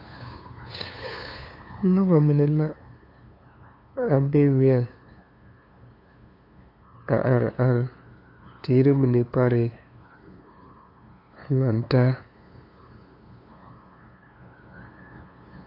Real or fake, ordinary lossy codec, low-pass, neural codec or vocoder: fake; AAC, 32 kbps; 5.4 kHz; codec, 16 kHz, 2 kbps, FunCodec, trained on LibriTTS, 25 frames a second